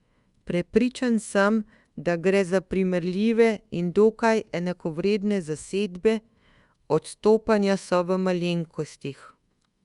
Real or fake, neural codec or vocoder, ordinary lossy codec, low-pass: fake; codec, 24 kHz, 1.2 kbps, DualCodec; Opus, 64 kbps; 10.8 kHz